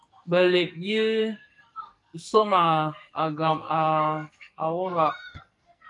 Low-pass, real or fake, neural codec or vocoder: 10.8 kHz; fake; codec, 44.1 kHz, 2.6 kbps, SNAC